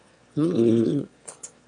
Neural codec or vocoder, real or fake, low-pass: autoencoder, 22.05 kHz, a latent of 192 numbers a frame, VITS, trained on one speaker; fake; 9.9 kHz